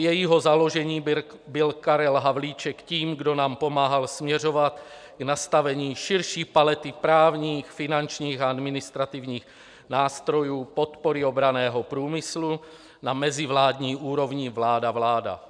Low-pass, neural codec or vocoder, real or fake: 9.9 kHz; none; real